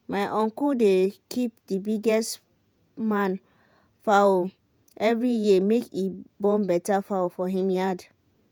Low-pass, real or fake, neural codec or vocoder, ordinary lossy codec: none; fake; vocoder, 48 kHz, 128 mel bands, Vocos; none